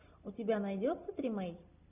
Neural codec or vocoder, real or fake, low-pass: none; real; 3.6 kHz